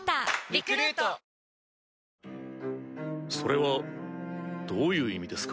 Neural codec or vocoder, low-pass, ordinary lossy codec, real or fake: none; none; none; real